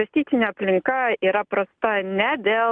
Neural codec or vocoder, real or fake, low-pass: none; real; 9.9 kHz